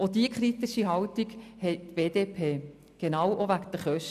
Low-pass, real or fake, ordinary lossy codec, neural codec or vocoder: 14.4 kHz; real; none; none